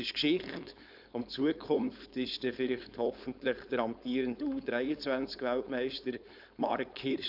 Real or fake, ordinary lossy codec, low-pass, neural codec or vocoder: fake; none; 5.4 kHz; codec, 16 kHz, 4.8 kbps, FACodec